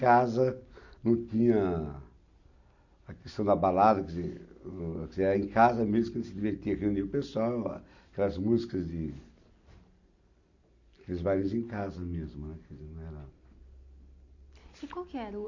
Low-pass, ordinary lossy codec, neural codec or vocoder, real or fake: 7.2 kHz; none; none; real